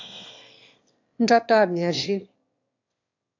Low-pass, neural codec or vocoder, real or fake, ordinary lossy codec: 7.2 kHz; autoencoder, 22.05 kHz, a latent of 192 numbers a frame, VITS, trained on one speaker; fake; AAC, 48 kbps